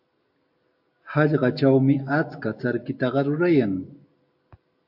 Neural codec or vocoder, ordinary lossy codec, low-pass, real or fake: none; AAC, 48 kbps; 5.4 kHz; real